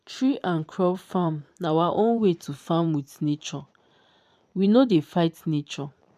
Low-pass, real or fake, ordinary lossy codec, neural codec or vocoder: 14.4 kHz; fake; none; vocoder, 44.1 kHz, 128 mel bands every 512 samples, BigVGAN v2